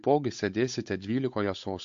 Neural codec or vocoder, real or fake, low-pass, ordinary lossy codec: codec, 16 kHz, 8 kbps, FunCodec, trained on Chinese and English, 25 frames a second; fake; 7.2 kHz; MP3, 48 kbps